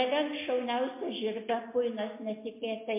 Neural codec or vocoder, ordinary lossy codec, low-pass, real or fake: none; MP3, 24 kbps; 3.6 kHz; real